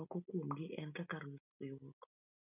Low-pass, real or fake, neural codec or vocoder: 3.6 kHz; real; none